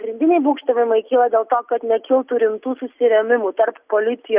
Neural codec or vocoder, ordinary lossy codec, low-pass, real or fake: none; Opus, 64 kbps; 3.6 kHz; real